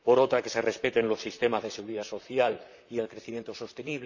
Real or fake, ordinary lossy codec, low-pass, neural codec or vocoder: fake; none; 7.2 kHz; vocoder, 44.1 kHz, 128 mel bands, Pupu-Vocoder